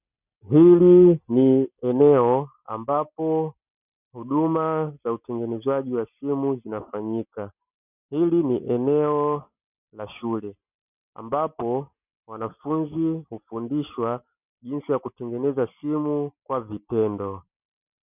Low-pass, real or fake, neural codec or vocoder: 3.6 kHz; real; none